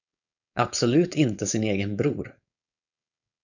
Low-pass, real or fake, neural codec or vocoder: 7.2 kHz; fake; codec, 16 kHz, 4.8 kbps, FACodec